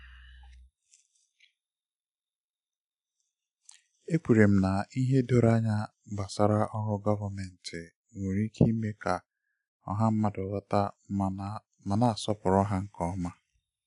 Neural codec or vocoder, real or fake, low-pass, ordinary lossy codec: none; real; 10.8 kHz; none